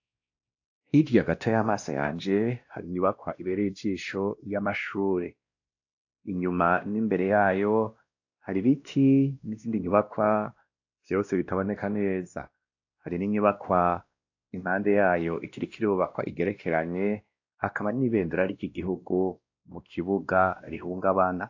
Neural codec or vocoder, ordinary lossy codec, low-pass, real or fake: codec, 16 kHz, 1 kbps, X-Codec, WavLM features, trained on Multilingual LibriSpeech; AAC, 48 kbps; 7.2 kHz; fake